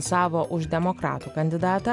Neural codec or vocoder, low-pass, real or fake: none; 10.8 kHz; real